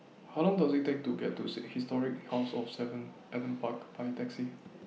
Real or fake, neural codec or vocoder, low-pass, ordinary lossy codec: real; none; none; none